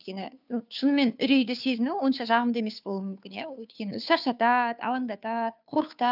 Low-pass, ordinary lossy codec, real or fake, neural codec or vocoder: 5.4 kHz; none; fake; codec, 16 kHz, 2 kbps, FunCodec, trained on Chinese and English, 25 frames a second